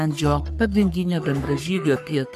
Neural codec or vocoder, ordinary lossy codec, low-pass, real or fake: codec, 44.1 kHz, 3.4 kbps, Pupu-Codec; MP3, 96 kbps; 14.4 kHz; fake